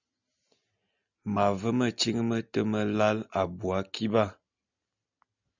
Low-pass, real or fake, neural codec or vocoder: 7.2 kHz; real; none